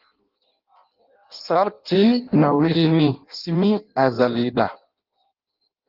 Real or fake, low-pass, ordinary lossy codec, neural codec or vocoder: fake; 5.4 kHz; Opus, 24 kbps; codec, 16 kHz in and 24 kHz out, 0.6 kbps, FireRedTTS-2 codec